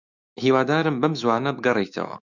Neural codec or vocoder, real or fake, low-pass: autoencoder, 48 kHz, 128 numbers a frame, DAC-VAE, trained on Japanese speech; fake; 7.2 kHz